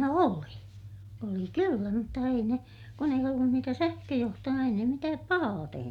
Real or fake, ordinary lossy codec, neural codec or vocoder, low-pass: real; none; none; 19.8 kHz